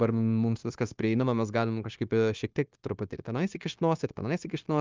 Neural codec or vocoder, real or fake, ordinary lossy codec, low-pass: codec, 16 kHz, 0.9 kbps, LongCat-Audio-Codec; fake; Opus, 24 kbps; 7.2 kHz